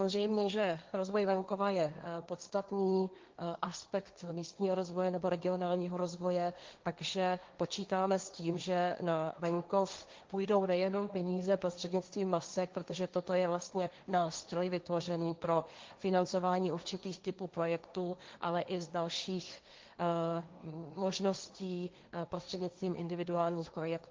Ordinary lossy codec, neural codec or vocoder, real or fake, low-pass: Opus, 32 kbps; codec, 16 kHz, 1.1 kbps, Voila-Tokenizer; fake; 7.2 kHz